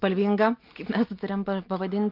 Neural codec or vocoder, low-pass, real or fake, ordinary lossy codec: none; 5.4 kHz; real; Opus, 24 kbps